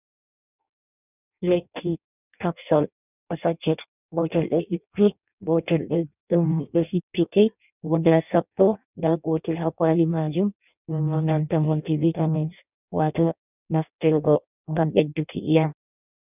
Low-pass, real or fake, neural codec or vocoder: 3.6 kHz; fake; codec, 16 kHz in and 24 kHz out, 0.6 kbps, FireRedTTS-2 codec